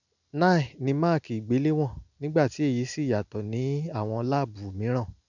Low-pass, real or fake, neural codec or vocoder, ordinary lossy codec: 7.2 kHz; real; none; none